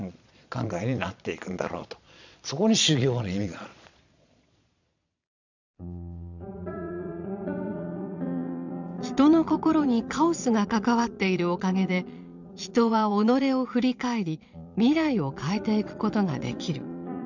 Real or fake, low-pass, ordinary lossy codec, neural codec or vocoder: fake; 7.2 kHz; none; vocoder, 22.05 kHz, 80 mel bands, Vocos